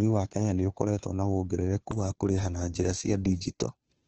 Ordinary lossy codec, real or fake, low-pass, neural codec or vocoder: Opus, 16 kbps; fake; 7.2 kHz; codec, 16 kHz, 2 kbps, FunCodec, trained on Chinese and English, 25 frames a second